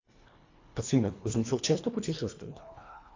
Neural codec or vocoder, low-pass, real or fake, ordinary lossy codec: codec, 24 kHz, 1.5 kbps, HILCodec; 7.2 kHz; fake; AAC, 48 kbps